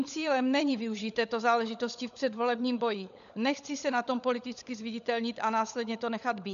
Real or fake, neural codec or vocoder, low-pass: fake; codec, 16 kHz, 16 kbps, FunCodec, trained on LibriTTS, 50 frames a second; 7.2 kHz